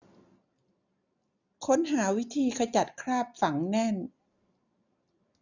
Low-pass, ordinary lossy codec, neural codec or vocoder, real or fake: 7.2 kHz; none; none; real